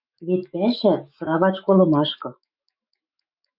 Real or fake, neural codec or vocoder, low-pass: fake; autoencoder, 48 kHz, 128 numbers a frame, DAC-VAE, trained on Japanese speech; 5.4 kHz